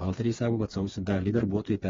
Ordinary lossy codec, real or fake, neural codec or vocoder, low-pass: AAC, 24 kbps; fake; codec, 16 kHz, 4 kbps, FreqCodec, smaller model; 7.2 kHz